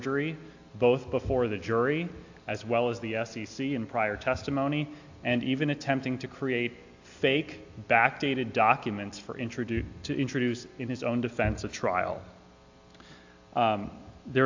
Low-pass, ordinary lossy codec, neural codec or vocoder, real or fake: 7.2 kHz; AAC, 48 kbps; none; real